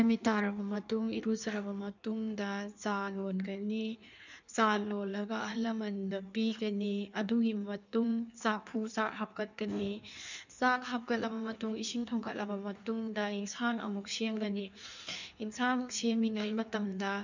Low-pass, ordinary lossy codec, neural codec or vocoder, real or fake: 7.2 kHz; none; codec, 16 kHz in and 24 kHz out, 1.1 kbps, FireRedTTS-2 codec; fake